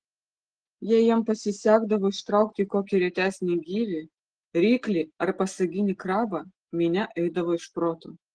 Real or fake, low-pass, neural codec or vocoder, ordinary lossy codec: real; 9.9 kHz; none; Opus, 16 kbps